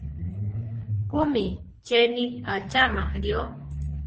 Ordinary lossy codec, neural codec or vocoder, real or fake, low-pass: MP3, 32 kbps; codec, 24 kHz, 3 kbps, HILCodec; fake; 10.8 kHz